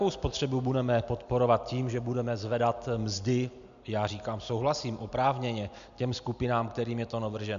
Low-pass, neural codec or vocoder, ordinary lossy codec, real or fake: 7.2 kHz; none; AAC, 96 kbps; real